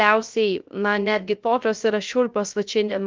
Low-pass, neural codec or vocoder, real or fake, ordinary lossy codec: 7.2 kHz; codec, 16 kHz, 0.3 kbps, FocalCodec; fake; Opus, 32 kbps